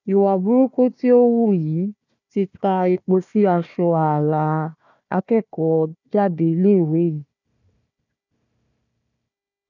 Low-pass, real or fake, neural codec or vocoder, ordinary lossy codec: 7.2 kHz; fake; codec, 16 kHz, 1 kbps, FunCodec, trained on Chinese and English, 50 frames a second; none